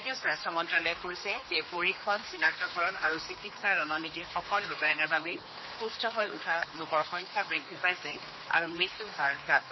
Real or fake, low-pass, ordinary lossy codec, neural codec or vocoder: fake; 7.2 kHz; MP3, 24 kbps; codec, 16 kHz, 2 kbps, X-Codec, HuBERT features, trained on general audio